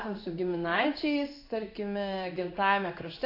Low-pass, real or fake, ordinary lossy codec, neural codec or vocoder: 5.4 kHz; fake; AAC, 32 kbps; codec, 16 kHz in and 24 kHz out, 1 kbps, XY-Tokenizer